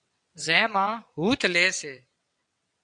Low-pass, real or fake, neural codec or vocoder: 9.9 kHz; fake; vocoder, 22.05 kHz, 80 mel bands, WaveNeXt